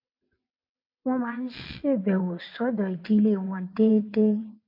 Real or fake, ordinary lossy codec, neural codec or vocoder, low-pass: fake; MP3, 32 kbps; vocoder, 22.05 kHz, 80 mel bands, WaveNeXt; 5.4 kHz